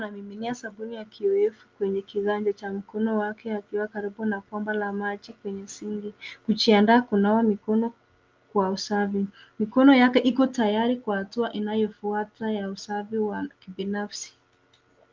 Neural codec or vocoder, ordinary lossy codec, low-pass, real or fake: none; Opus, 24 kbps; 7.2 kHz; real